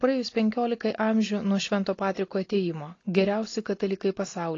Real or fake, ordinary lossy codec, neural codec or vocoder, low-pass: real; AAC, 32 kbps; none; 7.2 kHz